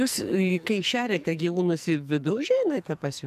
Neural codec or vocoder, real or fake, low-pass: codec, 44.1 kHz, 2.6 kbps, SNAC; fake; 14.4 kHz